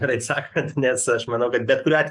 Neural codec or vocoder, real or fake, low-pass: codec, 44.1 kHz, 7.8 kbps, DAC; fake; 10.8 kHz